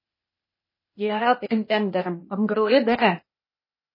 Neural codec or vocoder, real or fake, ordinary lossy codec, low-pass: codec, 16 kHz, 0.8 kbps, ZipCodec; fake; MP3, 24 kbps; 5.4 kHz